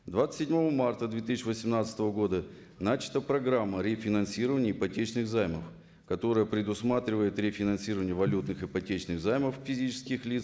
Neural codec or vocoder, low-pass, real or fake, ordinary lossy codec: none; none; real; none